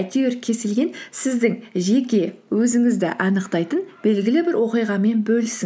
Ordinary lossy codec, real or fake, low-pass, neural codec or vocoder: none; real; none; none